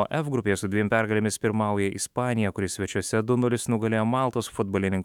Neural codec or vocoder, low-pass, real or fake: autoencoder, 48 kHz, 128 numbers a frame, DAC-VAE, trained on Japanese speech; 19.8 kHz; fake